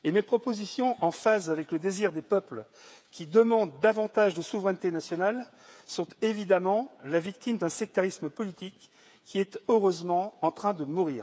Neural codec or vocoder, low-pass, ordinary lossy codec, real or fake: codec, 16 kHz, 8 kbps, FreqCodec, smaller model; none; none; fake